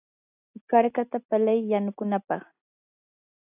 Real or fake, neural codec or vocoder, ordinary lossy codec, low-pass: real; none; AAC, 32 kbps; 3.6 kHz